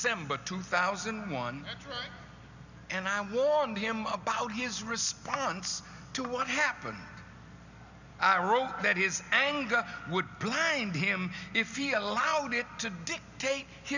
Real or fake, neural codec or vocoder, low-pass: real; none; 7.2 kHz